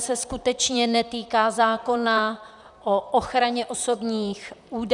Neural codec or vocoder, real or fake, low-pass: vocoder, 44.1 kHz, 128 mel bands, Pupu-Vocoder; fake; 10.8 kHz